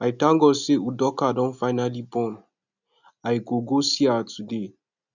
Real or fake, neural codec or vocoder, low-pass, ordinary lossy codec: real; none; 7.2 kHz; none